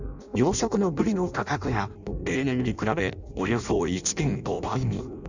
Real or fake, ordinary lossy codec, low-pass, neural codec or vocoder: fake; none; 7.2 kHz; codec, 16 kHz in and 24 kHz out, 0.6 kbps, FireRedTTS-2 codec